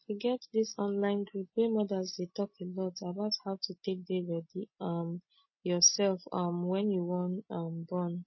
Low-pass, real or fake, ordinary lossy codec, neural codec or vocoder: 7.2 kHz; real; MP3, 24 kbps; none